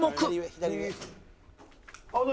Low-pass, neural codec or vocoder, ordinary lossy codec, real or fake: none; none; none; real